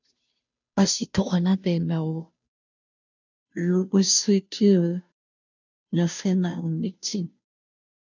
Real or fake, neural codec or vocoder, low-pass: fake; codec, 16 kHz, 0.5 kbps, FunCodec, trained on Chinese and English, 25 frames a second; 7.2 kHz